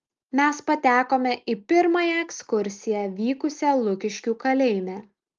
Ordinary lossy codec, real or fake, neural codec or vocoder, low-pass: Opus, 32 kbps; real; none; 7.2 kHz